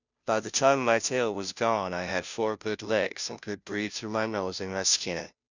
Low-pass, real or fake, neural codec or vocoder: 7.2 kHz; fake; codec, 16 kHz, 0.5 kbps, FunCodec, trained on Chinese and English, 25 frames a second